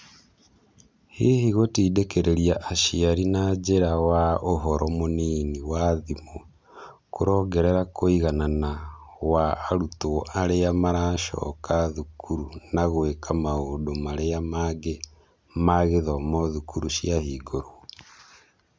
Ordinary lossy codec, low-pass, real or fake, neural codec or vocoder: none; none; real; none